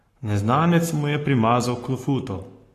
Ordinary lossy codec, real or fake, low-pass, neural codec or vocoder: AAC, 48 kbps; fake; 14.4 kHz; codec, 44.1 kHz, 7.8 kbps, Pupu-Codec